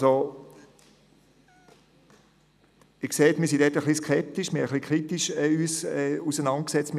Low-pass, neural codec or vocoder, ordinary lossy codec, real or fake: 14.4 kHz; none; none; real